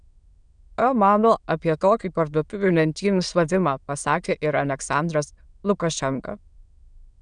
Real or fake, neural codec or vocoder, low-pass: fake; autoencoder, 22.05 kHz, a latent of 192 numbers a frame, VITS, trained on many speakers; 9.9 kHz